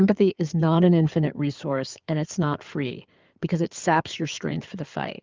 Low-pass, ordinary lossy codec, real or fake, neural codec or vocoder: 7.2 kHz; Opus, 24 kbps; fake; codec, 16 kHz in and 24 kHz out, 2.2 kbps, FireRedTTS-2 codec